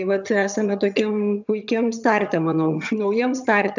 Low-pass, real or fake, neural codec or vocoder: 7.2 kHz; fake; vocoder, 22.05 kHz, 80 mel bands, HiFi-GAN